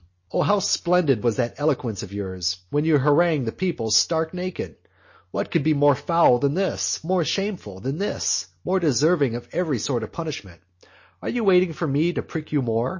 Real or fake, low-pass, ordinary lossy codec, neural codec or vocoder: real; 7.2 kHz; MP3, 32 kbps; none